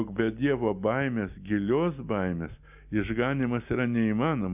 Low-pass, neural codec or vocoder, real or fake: 3.6 kHz; none; real